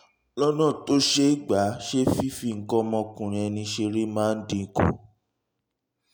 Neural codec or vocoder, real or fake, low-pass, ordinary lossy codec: none; real; none; none